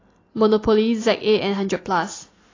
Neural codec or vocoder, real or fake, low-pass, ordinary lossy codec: none; real; 7.2 kHz; AAC, 32 kbps